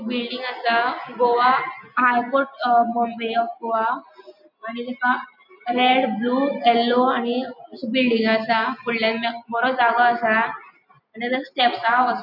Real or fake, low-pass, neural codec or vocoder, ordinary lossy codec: real; 5.4 kHz; none; none